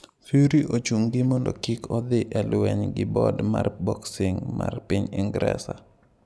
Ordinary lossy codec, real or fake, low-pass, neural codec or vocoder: none; real; none; none